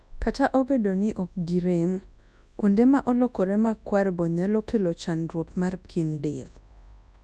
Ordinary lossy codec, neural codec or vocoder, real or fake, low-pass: none; codec, 24 kHz, 0.9 kbps, WavTokenizer, large speech release; fake; none